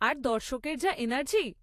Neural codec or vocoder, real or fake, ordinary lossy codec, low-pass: vocoder, 48 kHz, 128 mel bands, Vocos; fake; AAC, 64 kbps; 14.4 kHz